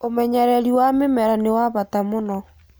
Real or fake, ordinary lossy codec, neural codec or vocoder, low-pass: real; none; none; none